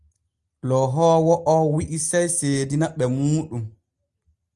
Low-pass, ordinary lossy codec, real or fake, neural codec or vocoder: 10.8 kHz; Opus, 32 kbps; real; none